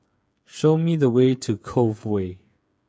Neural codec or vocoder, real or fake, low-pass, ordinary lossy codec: codec, 16 kHz, 8 kbps, FreqCodec, smaller model; fake; none; none